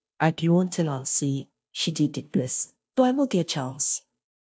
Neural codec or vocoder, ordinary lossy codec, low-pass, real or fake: codec, 16 kHz, 0.5 kbps, FunCodec, trained on Chinese and English, 25 frames a second; none; none; fake